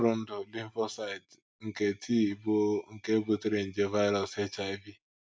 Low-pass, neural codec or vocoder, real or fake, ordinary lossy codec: none; none; real; none